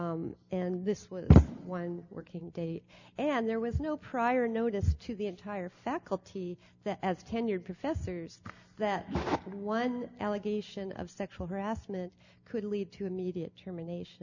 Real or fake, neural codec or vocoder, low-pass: real; none; 7.2 kHz